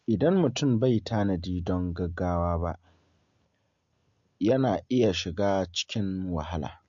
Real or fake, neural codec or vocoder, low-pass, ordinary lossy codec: real; none; 7.2 kHz; MP3, 48 kbps